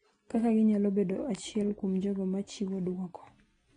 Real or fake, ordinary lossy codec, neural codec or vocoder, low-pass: real; AAC, 32 kbps; none; 9.9 kHz